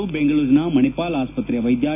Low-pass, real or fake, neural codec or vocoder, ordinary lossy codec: 3.6 kHz; real; none; none